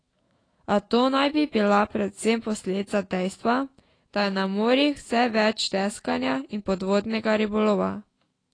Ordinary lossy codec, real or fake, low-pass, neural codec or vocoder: AAC, 32 kbps; fake; 9.9 kHz; autoencoder, 48 kHz, 128 numbers a frame, DAC-VAE, trained on Japanese speech